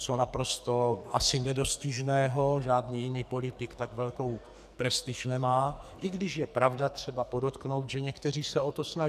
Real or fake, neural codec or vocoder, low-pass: fake; codec, 44.1 kHz, 2.6 kbps, SNAC; 14.4 kHz